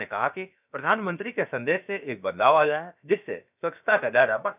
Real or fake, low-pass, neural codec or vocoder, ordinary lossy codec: fake; 3.6 kHz; codec, 16 kHz, about 1 kbps, DyCAST, with the encoder's durations; none